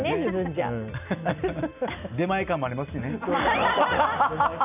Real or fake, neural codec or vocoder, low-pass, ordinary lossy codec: real; none; 3.6 kHz; none